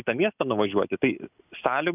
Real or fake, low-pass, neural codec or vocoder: real; 3.6 kHz; none